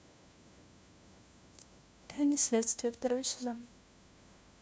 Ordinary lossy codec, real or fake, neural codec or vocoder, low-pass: none; fake; codec, 16 kHz, 1 kbps, FunCodec, trained on LibriTTS, 50 frames a second; none